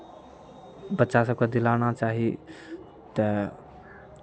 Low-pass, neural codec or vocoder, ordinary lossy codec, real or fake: none; none; none; real